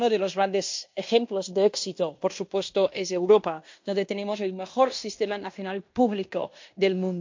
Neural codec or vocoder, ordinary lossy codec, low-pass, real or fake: codec, 16 kHz in and 24 kHz out, 0.9 kbps, LongCat-Audio-Codec, fine tuned four codebook decoder; MP3, 48 kbps; 7.2 kHz; fake